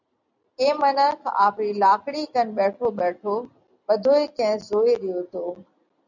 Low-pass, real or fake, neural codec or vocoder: 7.2 kHz; real; none